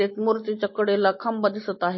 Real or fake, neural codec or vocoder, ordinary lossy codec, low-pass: real; none; MP3, 24 kbps; 7.2 kHz